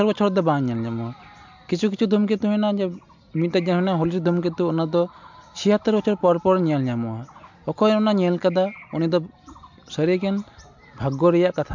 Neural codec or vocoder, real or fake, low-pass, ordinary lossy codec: none; real; 7.2 kHz; MP3, 64 kbps